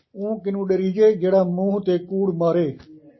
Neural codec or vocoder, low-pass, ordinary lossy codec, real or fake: none; 7.2 kHz; MP3, 24 kbps; real